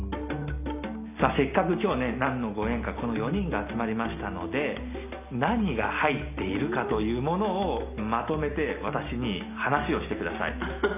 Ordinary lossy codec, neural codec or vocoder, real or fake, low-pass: none; none; real; 3.6 kHz